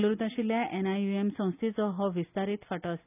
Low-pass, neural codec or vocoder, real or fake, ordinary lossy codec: 3.6 kHz; none; real; none